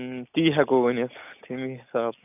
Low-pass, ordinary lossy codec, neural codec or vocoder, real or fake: 3.6 kHz; none; none; real